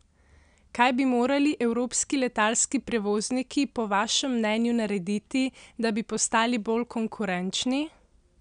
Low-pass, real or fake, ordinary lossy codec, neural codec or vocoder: 9.9 kHz; real; none; none